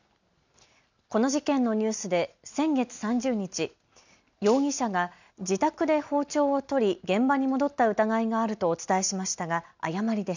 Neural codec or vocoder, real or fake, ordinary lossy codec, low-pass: none; real; none; 7.2 kHz